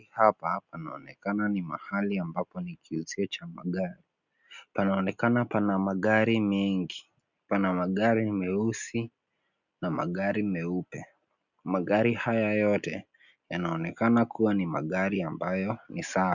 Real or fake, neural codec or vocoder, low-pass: real; none; 7.2 kHz